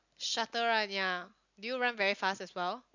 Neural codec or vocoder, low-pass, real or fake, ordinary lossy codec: none; 7.2 kHz; real; none